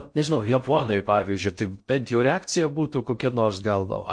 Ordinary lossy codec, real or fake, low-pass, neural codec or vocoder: MP3, 48 kbps; fake; 9.9 kHz; codec, 16 kHz in and 24 kHz out, 0.6 kbps, FocalCodec, streaming, 4096 codes